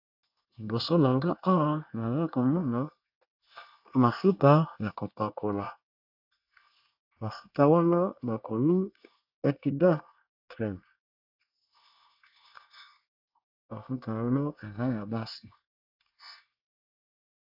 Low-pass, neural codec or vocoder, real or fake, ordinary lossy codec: 5.4 kHz; codec, 24 kHz, 1 kbps, SNAC; fake; AAC, 48 kbps